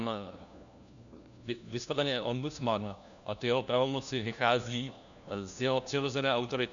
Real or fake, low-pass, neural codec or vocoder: fake; 7.2 kHz; codec, 16 kHz, 1 kbps, FunCodec, trained on LibriTTS, 50 frames a second